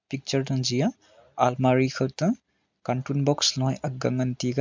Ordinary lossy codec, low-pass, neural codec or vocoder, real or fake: MP3, 64 kbps; 7.2 kHz; none; real